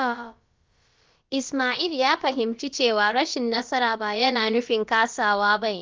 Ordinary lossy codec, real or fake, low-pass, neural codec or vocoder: Opus, 32 kbps; fake; 7.2 kHz; codec, 16 kHz, about 1 kbps, DyCAST, with the encoder's durations